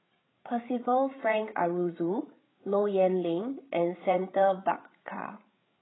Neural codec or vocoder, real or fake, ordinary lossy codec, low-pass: codec, 16 kHz, 8 kbps, FreqCodec, larger model; fake; AAC, 16 kbps; 7.2 kHz